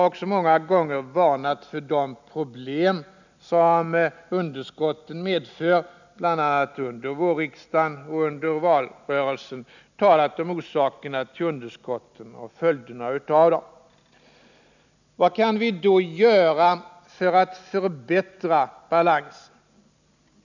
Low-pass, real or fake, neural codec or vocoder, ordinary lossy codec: 7.2 kHz; real; none; none